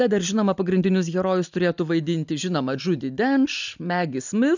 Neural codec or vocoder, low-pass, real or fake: none; 7.2 kHz; real